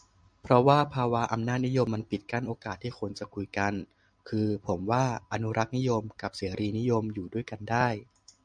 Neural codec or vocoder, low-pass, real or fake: none; 9.9 kHz; real